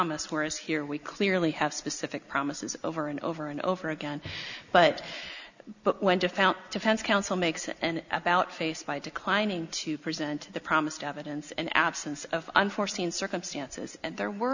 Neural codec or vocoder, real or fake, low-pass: none; real; 7.2 kHz